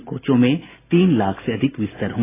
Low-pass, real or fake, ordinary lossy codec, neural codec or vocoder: 3.6 kHz; real; AAC, 16 kbps; none